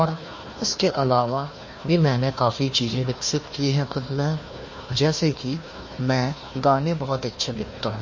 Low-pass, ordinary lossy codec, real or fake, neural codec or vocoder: 7.2 kHz; MP3, 32 kbps; fake; codec, 16 kHz, 1 kbps, FunCodec, trained on Chinese and English, 50 frames a second